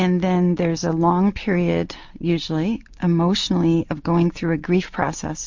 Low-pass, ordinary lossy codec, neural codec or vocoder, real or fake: 7.2 kHz; MP3, 48 kbps; none; real